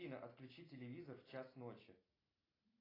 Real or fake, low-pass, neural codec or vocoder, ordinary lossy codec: real; 5.4 kHz; none; AAC, 24 kbps